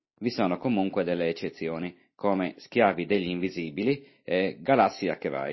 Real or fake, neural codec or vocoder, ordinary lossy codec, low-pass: real; none; MP3, 24 kbps; 7.2 kHz